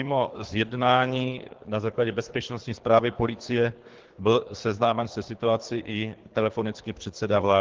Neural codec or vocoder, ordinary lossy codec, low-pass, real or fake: codec, 24 kHz, 3 kbps, HILCodec; Opus, 16 kbps; 7.2 kHz; fake